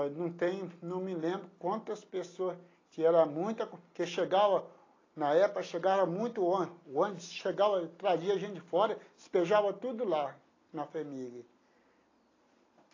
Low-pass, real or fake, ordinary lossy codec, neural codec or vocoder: 7.2 kHz; real; AAC, 32 kbps; none